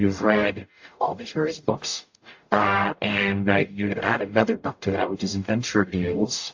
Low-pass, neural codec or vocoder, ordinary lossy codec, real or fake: 7.2 kHz; codec, 44.1 kHz, 0.9 kbps, DAC; AAC, 48 kbps; fake